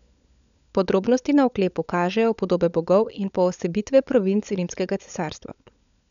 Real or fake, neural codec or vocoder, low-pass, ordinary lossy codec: fake; codec, 16 kHz, 16 kbps, FunCodec, trained on LibriTTS, 50 frames a second; 7.2 kHz; none